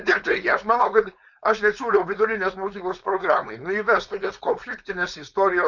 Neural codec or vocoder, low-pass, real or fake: codec, 16 kHz, 4.8 kbps, FACodec; 7.2 kHz; fake